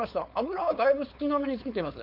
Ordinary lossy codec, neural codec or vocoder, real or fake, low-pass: none; codec, 16 kHz, 4.8 kbps, FACodec; fake; 5.4 kHz